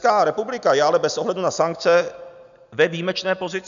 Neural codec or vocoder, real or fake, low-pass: none; real; 7.2 kHz